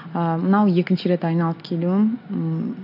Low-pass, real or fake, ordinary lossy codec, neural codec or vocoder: 5.4 kHz; real; none; none